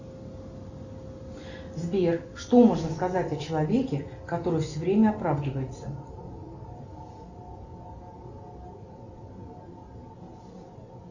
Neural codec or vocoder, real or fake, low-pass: none; real; 7.2 kHz